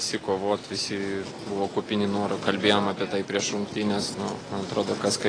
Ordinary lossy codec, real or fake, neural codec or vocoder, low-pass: AAC, 32 kbps; real; none; 9.9 kHz